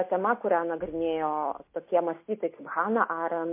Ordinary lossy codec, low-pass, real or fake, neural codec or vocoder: MP3, 24 kbps; 3.6 kHz; real; none